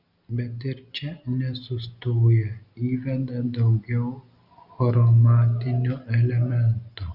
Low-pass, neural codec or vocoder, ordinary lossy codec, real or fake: 5.4 kHz; none; Opus, 64 kbps; real